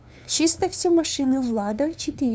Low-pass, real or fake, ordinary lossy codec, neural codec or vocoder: none; fake; none; codec, 16 kHz, 2 kbps, FunCodec, trained on LibriTTS, 25 frames a second